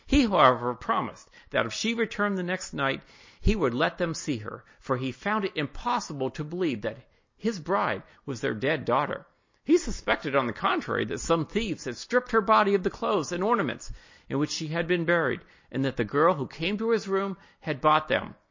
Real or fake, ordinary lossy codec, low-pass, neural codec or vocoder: real; MP3, 32 kbps; 7.2 kHz; none